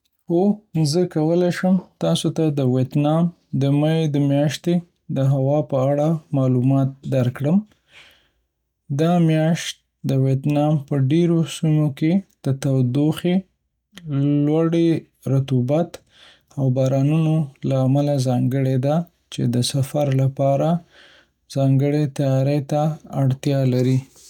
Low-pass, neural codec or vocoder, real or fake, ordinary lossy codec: 19.8 kHz; none; real; none